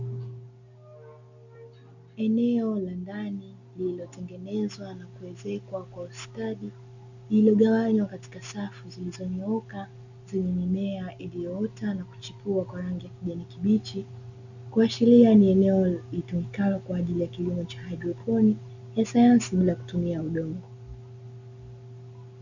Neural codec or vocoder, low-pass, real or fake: none; 7.2 kHz; real